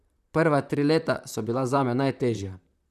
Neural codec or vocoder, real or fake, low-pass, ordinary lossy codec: vocoder, 44.1 kHz, 128 mel bands, Pupu-Vocoder; fake; 14.4 kHz; none